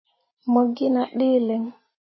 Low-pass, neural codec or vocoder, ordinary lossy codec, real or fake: 7.2 kHz; none; MP3, 24 kbps; real